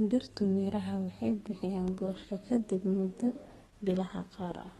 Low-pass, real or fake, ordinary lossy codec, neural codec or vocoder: 14.4 kHz; fake; AAC, 32 kbps; codec, 32 kHz, 1.9 kbps, SNAC